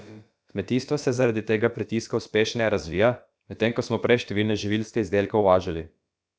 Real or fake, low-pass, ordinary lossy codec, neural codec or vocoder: fake; none; none; codec, 16 kHz, about 1 kbps, DyCAST, with the encoder's durations